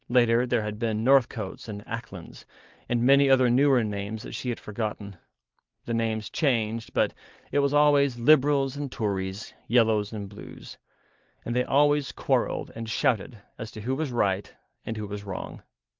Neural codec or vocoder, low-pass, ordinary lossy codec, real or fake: none; 7.2 kHz; Opus, 32 kbps; real